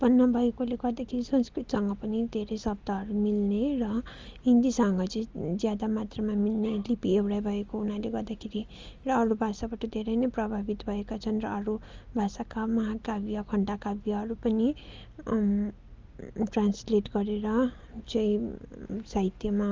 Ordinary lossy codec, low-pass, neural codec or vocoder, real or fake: Opus, 32 kbps; 7.2 kHz; none; real